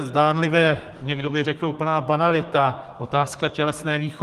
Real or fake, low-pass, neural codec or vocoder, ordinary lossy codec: fake; 14.4 kHz; codec, 32 kHz, 1.9 kbps, SNAC; Opus, 24 kbps